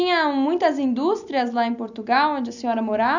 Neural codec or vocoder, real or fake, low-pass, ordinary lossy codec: none; real; 7.2 kHz; none